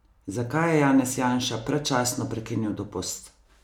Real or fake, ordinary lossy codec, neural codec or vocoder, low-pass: real; none; none; 19.8 kHz